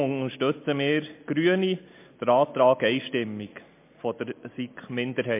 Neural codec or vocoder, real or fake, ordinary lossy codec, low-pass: none; real; MP3, 24 kbps; 3.6 kHz